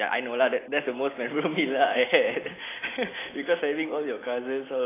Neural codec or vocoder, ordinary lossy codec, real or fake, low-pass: none; AAC, 16 kbps; real; 3.6 kHz